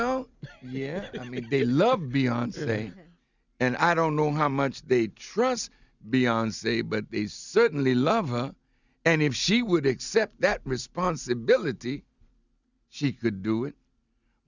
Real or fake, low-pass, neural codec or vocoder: real; 7.2 kHz; none